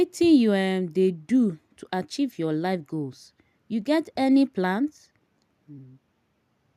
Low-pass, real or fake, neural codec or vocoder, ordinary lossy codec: 14.4 kHz; real; none; none